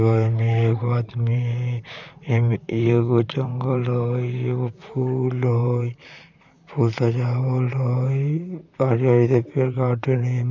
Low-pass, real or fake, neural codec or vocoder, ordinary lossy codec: 7.2 kHz; fake; vocoder, 44.1 kHz, 128 mel bands, Pupu-Vocoder; none